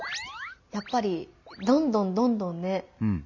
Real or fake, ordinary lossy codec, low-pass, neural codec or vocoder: real; none; 7.2 kHz; none